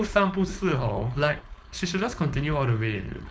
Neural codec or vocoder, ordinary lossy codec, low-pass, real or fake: codec, 16 kHz, 4.8 kbps, FACodec; none; none; fake